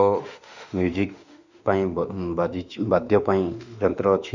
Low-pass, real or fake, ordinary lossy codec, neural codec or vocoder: 7.2 kHz; fake; none; autoencoder, 48 kHz, 32 numbers a frame, DAC-VAE, trained on Japanese speech